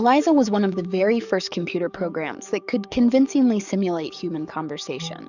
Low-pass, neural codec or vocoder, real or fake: 7.2 kHz; codec, 44.1 kHz, 7.8 kbps, DAC; fake